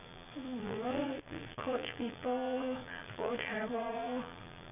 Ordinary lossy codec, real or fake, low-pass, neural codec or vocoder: none; fake; 3.6 kHz; vocoder, 22.05 kHz, 80 mel bands, Vocos